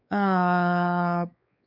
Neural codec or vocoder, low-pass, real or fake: codec, 16 kHz, 4 kbps, X-Codec, WavLM features, trained on Multilingual LibriSpeech; 5.4 kHz; fake